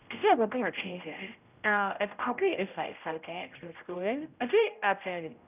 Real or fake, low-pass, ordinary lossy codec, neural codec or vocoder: fake; 3.6 kHz; none; codec, 16 kHz, 0.5 kbps, X-Codec, HuBERT features, trained on general audio